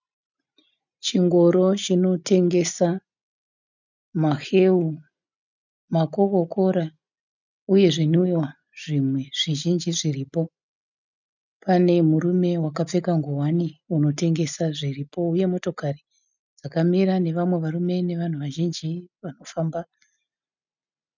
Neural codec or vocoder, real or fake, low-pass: none; real; 7.2 kHz